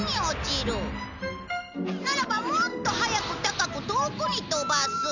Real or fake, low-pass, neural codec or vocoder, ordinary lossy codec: real; 7.2 kHz; none; none